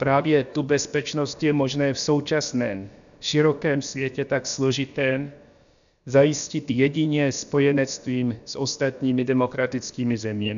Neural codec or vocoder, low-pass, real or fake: codec, 16 kHz, about 1 kbps, DyCAST, with the encoder's durations; 7.2 kHz; fake